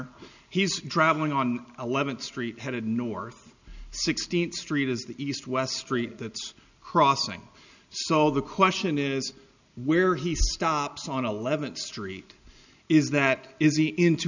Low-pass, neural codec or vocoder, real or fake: 7.2 kHz; none; real